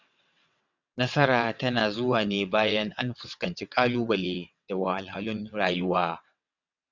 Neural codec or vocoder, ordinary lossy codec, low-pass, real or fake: vocoder, 22.05 kHz, 80 mel bands, WaveNeXt; none; 7.2 kHz; fake